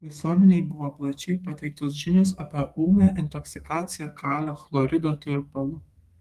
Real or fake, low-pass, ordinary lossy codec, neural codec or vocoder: fake; 14.4 kHz; Opus, 32 kbps; codec, 32 kHz, 1.9 kbps, SNAC